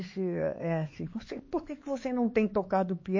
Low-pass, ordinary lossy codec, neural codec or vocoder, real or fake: 7.2 kHz; MP3, 32 kbps; codec, 16 kHz, 4 kbps, X-Codec, HuBERT features, trained on LibriSpeech; fake